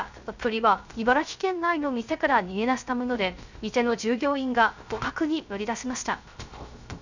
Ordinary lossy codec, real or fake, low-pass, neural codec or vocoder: none; fake; 7.2 kHz; codec, 16 kHz, 0.3 kbps, FocalCodec